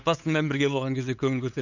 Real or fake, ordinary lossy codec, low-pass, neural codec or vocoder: fake; none; 7.2 kHz; codec, 16 kHz, 8 kbps, FunCodec, trained on LibriTTS, 25 frames a second